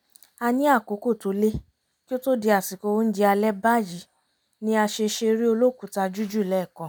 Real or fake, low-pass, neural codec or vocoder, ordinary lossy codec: real; none; none; none